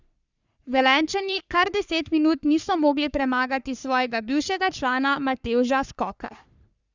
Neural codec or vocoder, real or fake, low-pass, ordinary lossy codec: codec, 44.1 kHz, 3.4 kbps, Pupu-Codec; fake; 7.2 kHz; Opus, 64 kbps